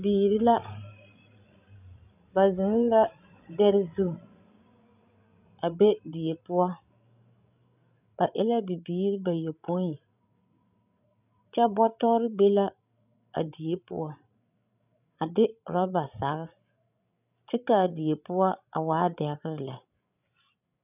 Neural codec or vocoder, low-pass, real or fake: codec, 16 kHz, 16 kbps, FreqCodec, larger model; 3.6 kHz; fake